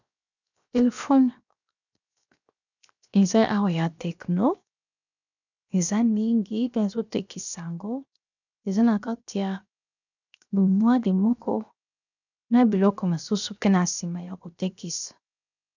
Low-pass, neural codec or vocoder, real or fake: 7.2 kHz; codec, 16 kHz, 0.7 kbps, FocalCodec; fake